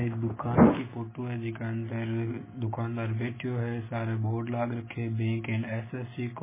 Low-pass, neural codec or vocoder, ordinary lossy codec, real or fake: 3.6 kHz; none; MP3, 16 kbps; real